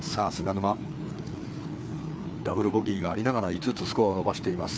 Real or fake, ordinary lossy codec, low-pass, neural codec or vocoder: fake; none; none; codec, 16 kHz, 4 kbps, FreqCodec, larger model